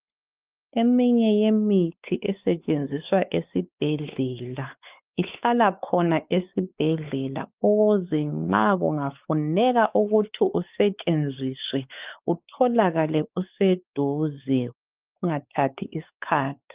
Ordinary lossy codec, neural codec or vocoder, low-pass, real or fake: Opus, 24 kbps; codec, 16 kHz, 4 kbps, X-Codec, WavLM features, trained on Multilingual LibriSpeech; 3.6 kHz; fake